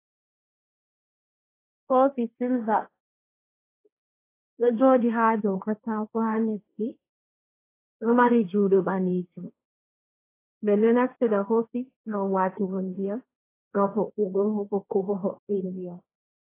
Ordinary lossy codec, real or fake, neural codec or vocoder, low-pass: AAC, 24 kbps; fake; codec, 16 kHz, 1.1 kbps, Voila-Tokenizer; 3.6 kHz